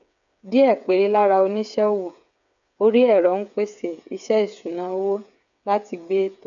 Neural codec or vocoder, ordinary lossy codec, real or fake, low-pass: codec, 16 kHz, 8 kbps, FreqCodec, smaller model; none; fake; 7.2 kHz